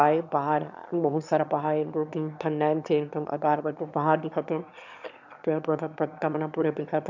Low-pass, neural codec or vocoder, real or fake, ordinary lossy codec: 7.2 kHz; autoencoder, 22.05 kHz, a latent of 192 numbers a frame, VITS, trained on one speaker; fake; none